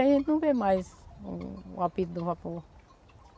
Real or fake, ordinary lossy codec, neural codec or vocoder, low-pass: real; none; none; none